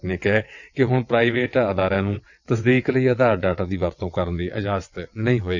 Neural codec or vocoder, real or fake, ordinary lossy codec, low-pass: vocoder, 22.05 kHz, 80 mel bands, WaveNeXt; fake; none; 7.2 kHz